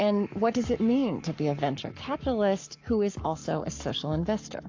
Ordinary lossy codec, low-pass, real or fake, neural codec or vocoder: AAC, 48 kbps; 7.2 kHz; fake; codec, 44.1 kHz, 7.8 kbps, Pupu-Codec